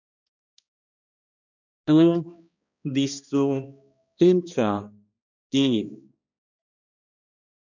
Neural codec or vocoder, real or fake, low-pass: codec, 16 kHz, 1 kbps, X-Codec, HuBERT features, trained on balanced general audio; fake; 7.2 kHz